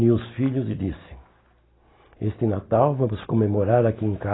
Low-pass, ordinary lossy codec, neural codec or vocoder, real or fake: 7.2 kHz; AAC, 16 kbps; none; real